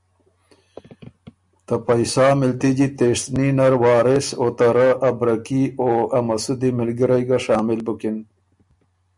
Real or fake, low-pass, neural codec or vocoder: real; 10.8 kHz; none